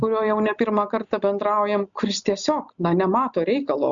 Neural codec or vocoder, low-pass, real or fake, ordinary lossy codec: none; 7.2 kHz; real; MP3, 96 kbps